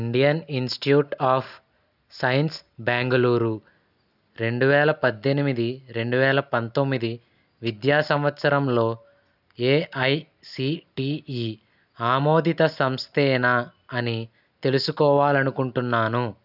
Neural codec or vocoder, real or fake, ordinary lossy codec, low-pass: none; real; none; 5.4 kHz